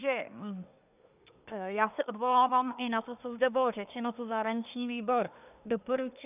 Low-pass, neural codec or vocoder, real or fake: 3.6 kHz; codec, 24 kHz, 1 kbps, SNAC; fake